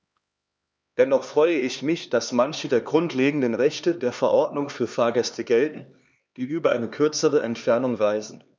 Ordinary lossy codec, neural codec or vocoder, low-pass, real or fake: none; codec, 16 kHz, 2 kbps, X-Codec, HuBERT features, trained on LibriSpeech; none; fake